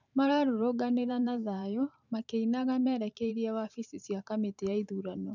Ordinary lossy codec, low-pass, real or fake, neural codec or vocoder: none; 7.2 kHz; fake; vocoder, 44.1 kHz, 128 mel bands every 512 samples, BigVGAN v2